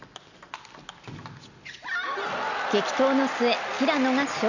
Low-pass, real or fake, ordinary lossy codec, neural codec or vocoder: 7.2 kHz; real; none; none